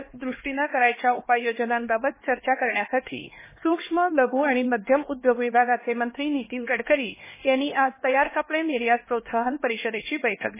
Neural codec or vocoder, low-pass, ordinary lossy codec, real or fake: codec, 16 kHz, 2 kbps, X-Codec, HuBERT features, trained on LibriSpeech; 3.6 kHz; MP3, 16 kbps; fake